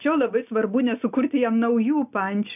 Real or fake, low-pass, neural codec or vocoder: real; 3.6 kHz; none